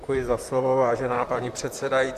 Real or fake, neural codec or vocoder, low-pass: fake; vocoder, 44.1 kHz, 128 mel bands, Pupu-Vocoder; 14.4 kHz